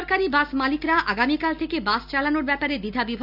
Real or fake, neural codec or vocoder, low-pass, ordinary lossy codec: real; none; 5.4 kHz; none